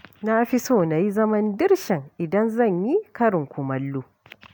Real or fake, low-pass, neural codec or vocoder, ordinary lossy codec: real; none; none; none